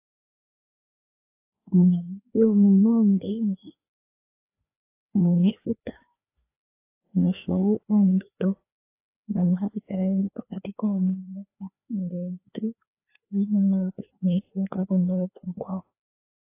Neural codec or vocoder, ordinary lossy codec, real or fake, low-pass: codec, 16 kHz, 2 kbps, FreqCodec, larger model; AAC, 24 kbps; fake; 3.6 kHz